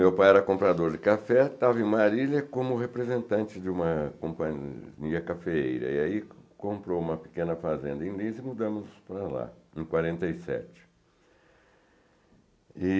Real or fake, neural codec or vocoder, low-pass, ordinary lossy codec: real; none; none; none